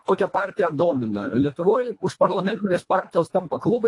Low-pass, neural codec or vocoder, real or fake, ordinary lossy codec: 10.8 kHz; codec, 24 kHz, 1.5 kbps, HILCodec; fake; AAC, 48 kbps